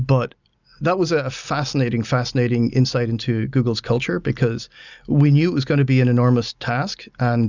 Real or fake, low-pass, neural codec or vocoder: real; 7.2 kHz; none